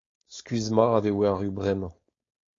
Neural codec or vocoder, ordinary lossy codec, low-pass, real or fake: codec, 16 kHz, 4.8 kbps, FACodec; AAC, 32 kbps; 7.2 kHz; fake